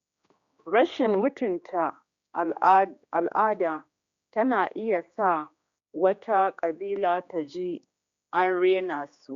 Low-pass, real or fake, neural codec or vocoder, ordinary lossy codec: 7.2 kHz; fake; codec, 16 kHz, 2 kbps, X-Codec, HuBERT features, trained on general audio; Opus, 64 kbps